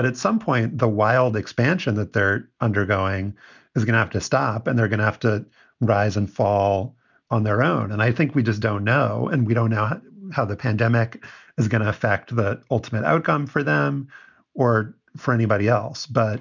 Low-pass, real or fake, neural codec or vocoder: 7.2 kHz; real; none